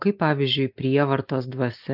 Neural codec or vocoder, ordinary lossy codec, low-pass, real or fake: none; AAC, 48 kbps; 5.4 kHz; real